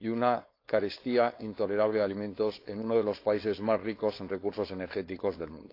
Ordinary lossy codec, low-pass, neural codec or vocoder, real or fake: AAC, 32 kbps; 5.4 kHz; codec, 16 kHz, 4.8 kbps, FACodec; fake